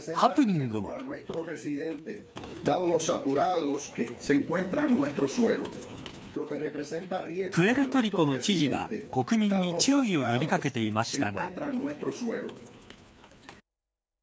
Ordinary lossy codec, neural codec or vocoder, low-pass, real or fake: none; codec, 16 kHz, 2 kbps, FreqCodec, larger model; none; fake